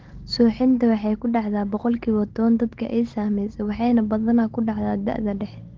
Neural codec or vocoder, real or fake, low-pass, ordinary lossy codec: none; real; 7.2 kHz; Opus, 16 kbps